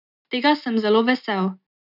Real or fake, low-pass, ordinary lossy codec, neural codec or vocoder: real; 5.4 kHz; none; none